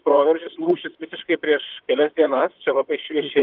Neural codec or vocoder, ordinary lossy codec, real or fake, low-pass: vocoder, 44.1 kHz, 128 mel bands, Pupu-Vocoder; Opus, 32 kbps; fake; 5.4 kHz